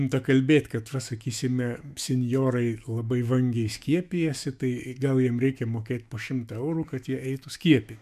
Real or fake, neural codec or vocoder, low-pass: fake; autoencoder, 48 kHz, 128 numbers a frame, DAC-VAE, trained on Japanese speech; 14.4 kHz